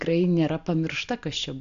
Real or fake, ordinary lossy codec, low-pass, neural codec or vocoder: real; AAC, 64 kbps; 7.2 kHz; none